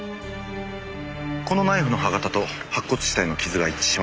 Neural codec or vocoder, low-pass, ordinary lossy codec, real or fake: none; none; none; real